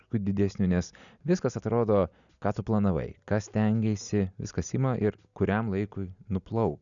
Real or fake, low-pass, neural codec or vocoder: real; 7.2 kHz; none